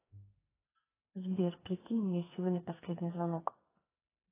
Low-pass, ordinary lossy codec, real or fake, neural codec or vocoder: 3.6 kHz; AAC, 16 kbps; fake; codec, 44.1 kHz, 2.6 kbps, SNAC